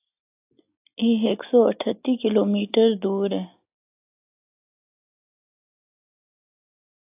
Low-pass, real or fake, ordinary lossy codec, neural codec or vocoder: 3.6 kHz; real; AAC, 32 kbps; none